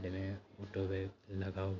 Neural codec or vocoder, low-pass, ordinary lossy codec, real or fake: none; 7.2 kHz; none; real